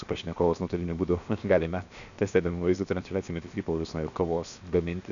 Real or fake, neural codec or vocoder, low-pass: fake; codec, 16 kHz, 0.7 kbps, FocalCodec; 7.2 kHz